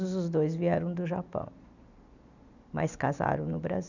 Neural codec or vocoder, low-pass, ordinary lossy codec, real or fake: none; 7.2 kHz; none; real